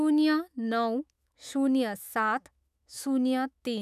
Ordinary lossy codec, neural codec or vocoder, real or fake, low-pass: none; autoencoder, 48 kHz, 128 numbers a frame, DAC-VAE, trained on Japanese speech; fake; 14.4 kHz